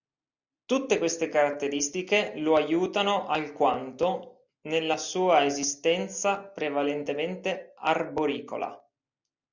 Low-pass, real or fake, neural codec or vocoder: 7.2 kHz; real; none